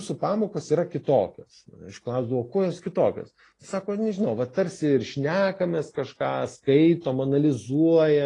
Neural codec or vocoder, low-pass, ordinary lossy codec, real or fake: none; 10.8 kHz; AAC, 32 kbps; real